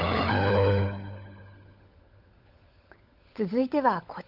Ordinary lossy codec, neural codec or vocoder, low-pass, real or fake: Opus, 24 kbps; codec, 16 kHz, 16 kbps, FunCodec, trained on LibriTTS, 50 frames a second; 5.4 kHz; fake